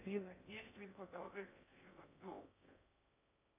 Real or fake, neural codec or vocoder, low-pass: fake; codec, 16 kHz in and 24 kHz out, 0.6 kbps, FocalCodec, streaming, 2048 codes; 3.6 kHz